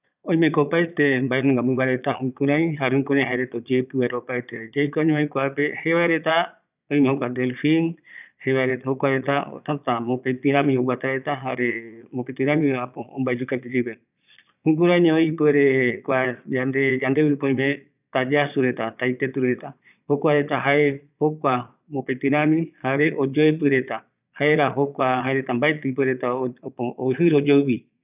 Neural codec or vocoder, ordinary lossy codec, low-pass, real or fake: vocoder, 22.05 kHz, 80 mel bands, Vocos; none; 3.6 kHz; fake